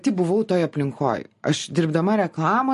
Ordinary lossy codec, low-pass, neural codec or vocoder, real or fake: MP3, 48 kbps; 14.4 kHz; none; real